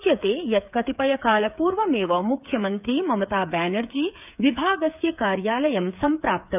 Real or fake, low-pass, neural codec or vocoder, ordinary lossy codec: fake; 3.6 kHz; codec, 16 kHz, 8 kbps, FreqCodec, smaller model; none